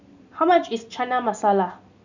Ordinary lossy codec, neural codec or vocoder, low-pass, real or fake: none; none; 7.2 kHz; real